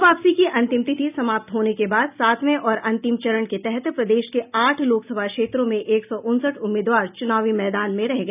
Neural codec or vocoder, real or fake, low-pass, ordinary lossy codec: none; real; 3.6 kHz; none